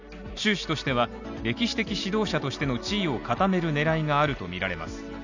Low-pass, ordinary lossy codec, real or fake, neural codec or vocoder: 7.2 kHz; none; real; none